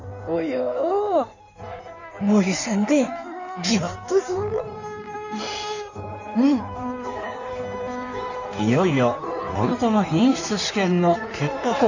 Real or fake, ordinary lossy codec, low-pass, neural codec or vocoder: fake; none; 7.2 kHz; codec, 16 kHz in and 24 kHz out, 1.1 kbps, FireRedTTS-2 codec